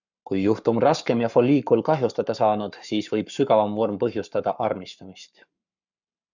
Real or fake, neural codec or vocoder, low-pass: fake; codec, 44.1 kHz, 7.8 kbps, Pupu-Codec; 7.2 kHz